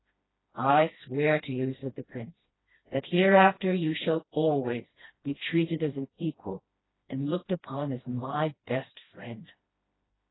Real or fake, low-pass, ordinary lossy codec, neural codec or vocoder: fake; 7.2 kHz; AAC, 16 kbps; codec, 16 kHz, 1 kbps, FreqCodec, smaller model